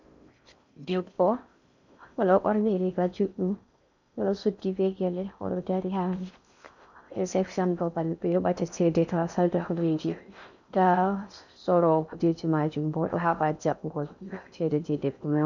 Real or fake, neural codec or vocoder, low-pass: fake; codec, 16 kHz in and 24 kHz out, 0.6 kbps, FocalCodec, streaming, 4096 codes; 7.2 kHz